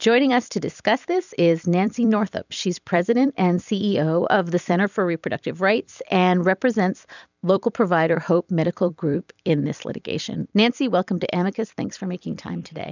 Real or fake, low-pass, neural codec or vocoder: fake; 7.2 kHz; vocoder, 44.1 kHz, 128 mel bands every 256 samples, BigVGAN v2